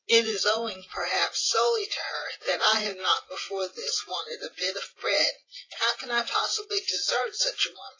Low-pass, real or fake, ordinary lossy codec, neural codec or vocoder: 7.2 kHz; fake; AAC, 32 kbps; vocoder, 24 kHz, 100 mel bands, Vocos